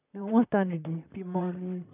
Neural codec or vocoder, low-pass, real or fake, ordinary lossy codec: vocoder, 44.1 kHz, 128 mel bands, Pupu-Vocoder; 3.6 kHz; fake; none